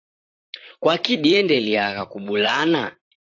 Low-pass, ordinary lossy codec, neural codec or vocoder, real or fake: 7.2 kHz; AAC, 48 kbps; vocoder, 44.1 kHz, 128 mel bands, Pupu-Vocoder; fake